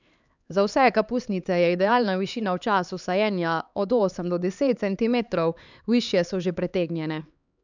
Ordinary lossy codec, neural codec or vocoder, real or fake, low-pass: none; codec, 16 kHz, 4 kbps, X-Codec, HuBERT features, trained on LibriSpeech; fake; 7.2 kHz